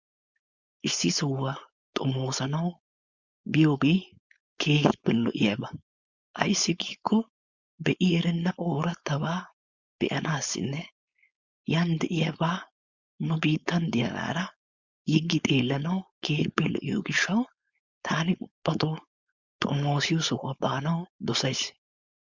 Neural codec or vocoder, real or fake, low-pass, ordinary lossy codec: codec, 16 kHz, 4.8 kbps, FACodec; fake; 7.2 kHz; Opus, 64 kbps